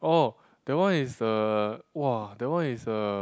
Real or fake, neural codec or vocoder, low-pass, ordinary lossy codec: real; none; none; none